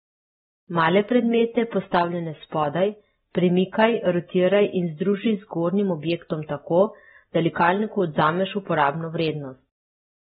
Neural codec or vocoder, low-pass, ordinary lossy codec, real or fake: none; 19.8 kHz; AAC, 16 kbps; real